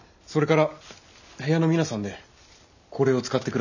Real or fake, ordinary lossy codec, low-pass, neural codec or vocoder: real; none; 7.2 kHz; none